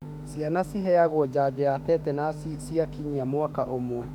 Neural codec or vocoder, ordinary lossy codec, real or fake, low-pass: autoencoder, 48 kHz, 32 numbers a frame, DAC-VAE, trained on Japanese speech; none; fake; 19.8 kHz